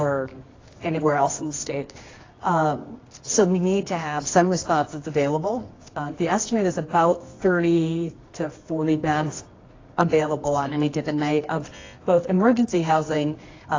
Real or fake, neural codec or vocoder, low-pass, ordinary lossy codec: fake; codec, 24 kHz, 0.9 kbps, WavTokenizer, medium music audio release; 7.2 kHz; AAC, 32 kbps